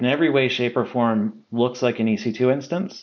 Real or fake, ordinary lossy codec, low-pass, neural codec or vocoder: real; MP3, 64 kbps; 7.2 kHz; none